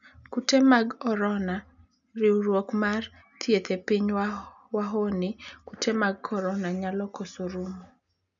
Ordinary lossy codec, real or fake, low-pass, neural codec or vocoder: none; real; 7.2 kHz; none